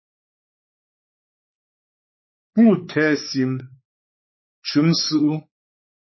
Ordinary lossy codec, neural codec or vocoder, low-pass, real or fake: MP3, 24 kbps; codec, 16 kHz, 4 kbps, X-Codec, WavLM features, trained on Multilingual LibriSpeech; 7.2 kHz; fake